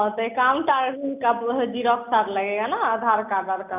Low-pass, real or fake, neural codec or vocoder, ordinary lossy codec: 3.6 kHz; real; none; none